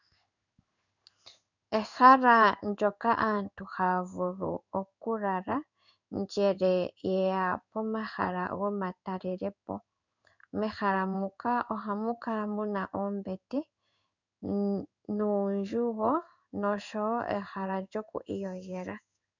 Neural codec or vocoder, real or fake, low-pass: codec, 16 kHz in and 24 kHz out, 1 kbps, XY-Tokenizer; fake; 7.2 kHz